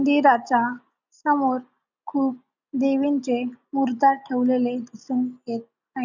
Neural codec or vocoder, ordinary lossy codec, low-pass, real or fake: none; none; 7.2 kHz; real